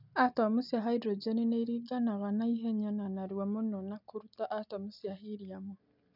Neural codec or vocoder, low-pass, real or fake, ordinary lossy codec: none; 5.4 kHz; real; none